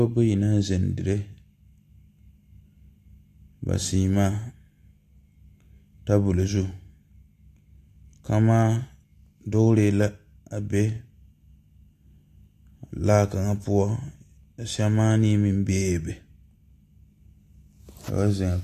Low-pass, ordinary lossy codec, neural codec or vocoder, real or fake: 14.4 kHz; AAC, 64 kbps; none; real